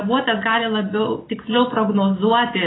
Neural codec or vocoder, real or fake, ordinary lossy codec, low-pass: none; real; AAC, 16 kbps; 7.2 kHz